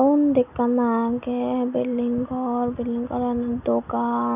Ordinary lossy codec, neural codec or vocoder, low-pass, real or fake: none; none; 3.6 kHz; real